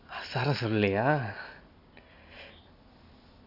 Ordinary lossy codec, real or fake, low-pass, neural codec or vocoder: none; real; 5.4 kHz; none